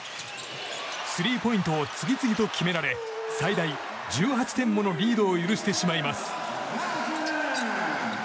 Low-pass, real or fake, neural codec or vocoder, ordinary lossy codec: none; real; none; none